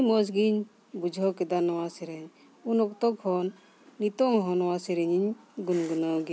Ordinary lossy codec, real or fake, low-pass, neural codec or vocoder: none; real; none; none